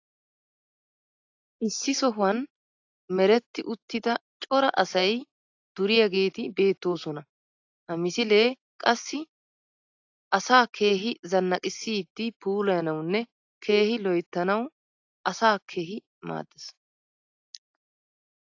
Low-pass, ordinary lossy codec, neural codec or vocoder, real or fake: 7.2 kHz; AAC, 48 kbps; none; real